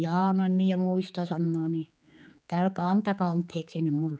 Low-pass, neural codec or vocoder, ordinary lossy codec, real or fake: none; codec, 16 kHz, 4 kbps, X-Codec, HuBERT features, trained on general audio; none; fake